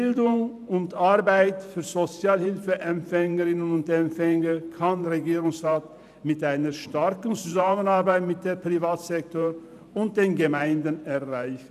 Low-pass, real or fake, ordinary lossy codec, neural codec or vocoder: 14.4 kHz; fake; none; vocoder, 44.1 kHz, 128 mel bands every 512 samples, BigVGAN v2